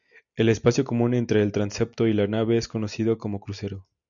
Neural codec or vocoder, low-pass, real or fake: none; 7.2 kHz; real